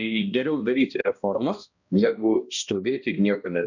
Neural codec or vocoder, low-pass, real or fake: codec, 16 kHz, 1 kbps, X-Codec, HuBERT features, trained on balanced general audio; 7.2 kHz; fake